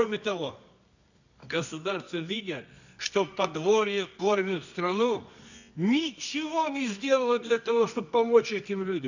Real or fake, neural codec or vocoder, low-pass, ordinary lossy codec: fake; codec, 24 kHz, 0.9 kbps, WavTokenizer, medium music audio release; 7.2 kHz; none